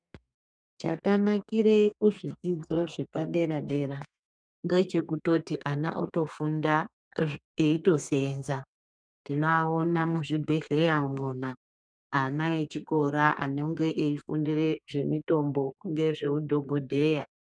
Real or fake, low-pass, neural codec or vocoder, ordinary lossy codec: fake; 9.9 kHz; codec, 32 kHz, 1.9 kbps, SNAC; MP3, 96 kbps